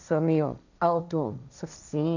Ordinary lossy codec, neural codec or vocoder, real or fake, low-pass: none; codec, 16 kHz, 1.1 kbps, Voila-Tokenizer; fake; 7.2 kHz